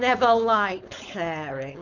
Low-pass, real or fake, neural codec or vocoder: 7.2 kHz; fake; codec, 16 kHz, 4.8 kbps, FACodec